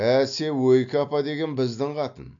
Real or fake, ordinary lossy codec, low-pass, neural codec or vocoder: real; AAC, 64 kbps; 7.2 kHz; none